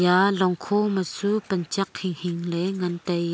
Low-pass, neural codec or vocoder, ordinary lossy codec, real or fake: none; none; none; real